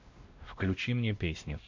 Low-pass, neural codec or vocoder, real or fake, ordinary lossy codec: 7.2 kHz; codec, 16 kHz, 1 kbps, X-Codec, WavLM features, trained on Multilingual LibriSpeech; fake; MP3, 48 kbps